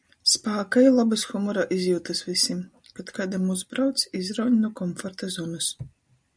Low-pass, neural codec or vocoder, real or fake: 9.9 kHz; none; real